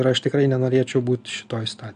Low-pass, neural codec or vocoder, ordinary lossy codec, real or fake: 9.9 kHz; none; AAC, 96 kbps; real